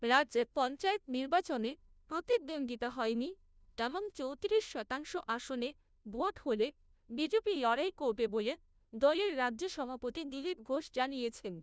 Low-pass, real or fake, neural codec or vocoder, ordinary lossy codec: none; fake; codec, 16 kHz, 0.5 kbps, FunCodec, trained on Chinese and English, 25 frames a second; none